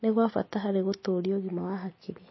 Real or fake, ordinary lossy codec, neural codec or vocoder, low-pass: real; MP3, 24 kbps; none; 7.2 kHz